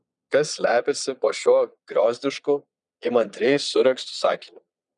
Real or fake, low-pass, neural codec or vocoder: fake; 9.9 kHz; vocoder, 22.05 kHz, 80 mel bands, Vocos